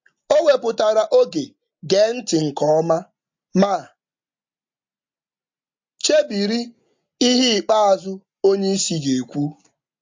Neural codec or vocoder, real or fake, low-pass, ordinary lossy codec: none; real; 7.2 kHz; MP3, 48 kbps